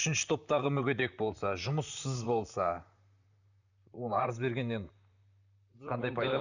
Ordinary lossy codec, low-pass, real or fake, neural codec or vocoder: none; 7.2 kHz; fake; vocoder, 44.1 kHz, 128 mel bands, Pupu-Vocoder